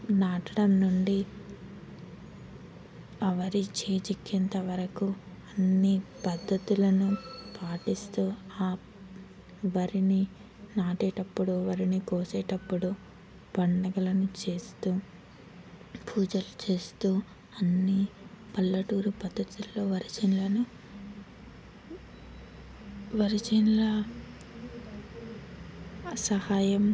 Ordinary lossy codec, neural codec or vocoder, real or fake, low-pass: none; none; real; none